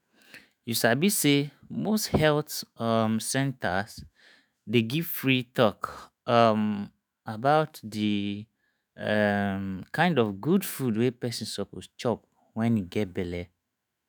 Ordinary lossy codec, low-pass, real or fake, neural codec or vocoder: none; none; fake; autoencoder, 48 kHz, 128 numbers a frame, DAC-VAE, trained on Japanese speech